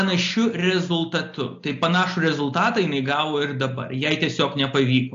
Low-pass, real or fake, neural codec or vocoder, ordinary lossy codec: 7.2 kHz; real; none; AAC, 48 kbps